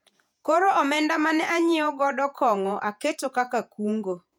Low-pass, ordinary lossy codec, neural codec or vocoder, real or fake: 19.8 kHz; none; vocoder, 48 kHz, 128 mel bands, Vocos; fake